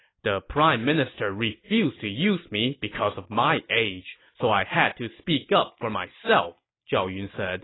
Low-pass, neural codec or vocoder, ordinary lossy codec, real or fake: 7.2 kHz; none; AAC, 16 kbps; real